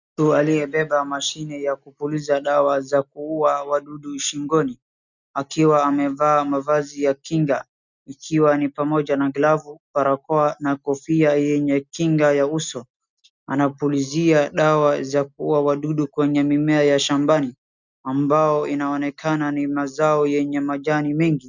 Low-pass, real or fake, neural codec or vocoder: 7.2 kHz; real; none